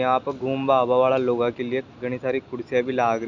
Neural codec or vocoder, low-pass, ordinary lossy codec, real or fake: none; 7.2 kHz; none; real